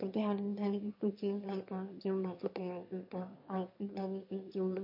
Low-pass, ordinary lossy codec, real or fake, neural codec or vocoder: 5.4 kHz; MP3, 32 kbps; fake; autoencoder, 22.05 kHz, a latent of 192 numbers a frame, VITS, trained on one speaker